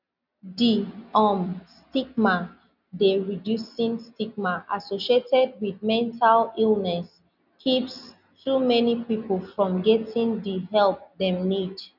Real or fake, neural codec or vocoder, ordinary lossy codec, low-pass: real; none; none; 5.4 kHz